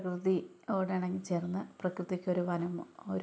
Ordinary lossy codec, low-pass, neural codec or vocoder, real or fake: none; none; none; real